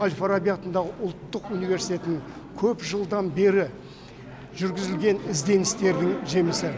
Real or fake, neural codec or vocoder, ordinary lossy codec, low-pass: real; none; none; none